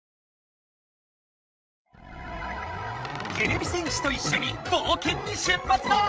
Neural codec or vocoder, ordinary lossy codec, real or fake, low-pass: codec, 16 kHz, 16 kbps, FreqCodec, larger model; none; fake; none